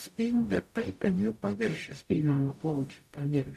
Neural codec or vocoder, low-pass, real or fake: codec, 44.1 kHz, 0.9 kbps, DAC; 14.4 kHz; fake